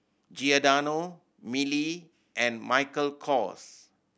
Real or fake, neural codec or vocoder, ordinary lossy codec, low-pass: real; none; none; none